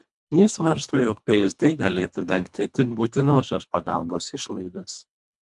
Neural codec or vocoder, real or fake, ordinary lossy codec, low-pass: codec, 24 kHz, 1.5 kbps, HILCodec; fake; MP3, 96 kbps; 10.8 kHz